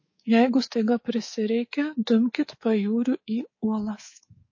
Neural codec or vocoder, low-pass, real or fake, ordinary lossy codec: codec, 24 kHz, 3.1 kbps, DualCodec; 7.2 kHz; fake; MP3, 32 kbps